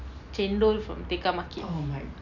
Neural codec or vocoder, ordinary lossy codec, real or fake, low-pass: none; none; real; 7.2 kHz